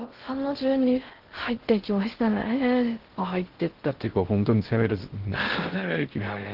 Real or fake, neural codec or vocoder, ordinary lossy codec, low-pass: fake; codec, 16 kHz in and 24 kHz out, 0.6 kbps, FocalCodec, streaming, 2048 codes; Opus, 16 kbps; 5.4 kHz